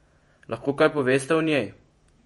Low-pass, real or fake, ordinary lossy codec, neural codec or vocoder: 19.8 kHz; fake; MP3, 48 kbps; vocoder, 48 kHz, 128 mel bands, Vocos